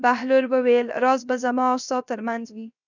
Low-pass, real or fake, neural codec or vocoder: 7.2 kHz; fake; codec, 16 kHz, 0.7 kbps, FocalCodec